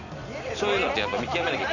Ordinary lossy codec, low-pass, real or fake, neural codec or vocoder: none; 7.2 kHz; real; none